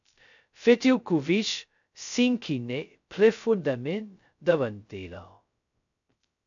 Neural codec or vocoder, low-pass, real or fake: codec, 16 kHz, 0.2 kbps, FocalCodec; 7.2 kHz; fake